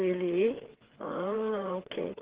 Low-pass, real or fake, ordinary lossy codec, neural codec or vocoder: 3.6 kHz; fake; Opus, 16 kbps; codec, 16 kHz, 4 kbps, FreqCodec, larger model